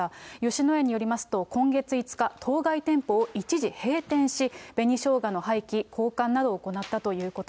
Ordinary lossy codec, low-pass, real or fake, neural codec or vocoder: none; none; real; none